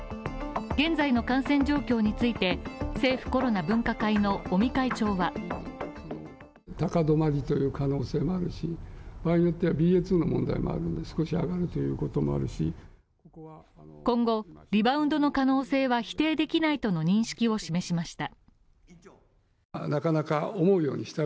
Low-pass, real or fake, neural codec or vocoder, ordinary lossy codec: none; real; none; none